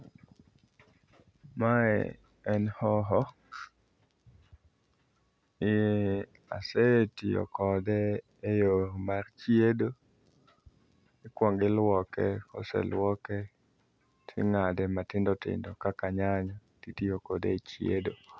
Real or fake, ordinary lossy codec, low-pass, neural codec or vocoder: real; none; none; none